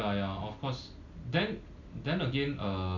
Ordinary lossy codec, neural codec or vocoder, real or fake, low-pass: none; none; real; 7.2 kHz